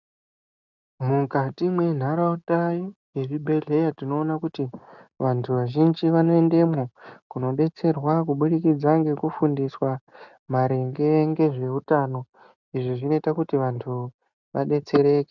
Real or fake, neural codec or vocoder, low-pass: real; none; 7.2 kHz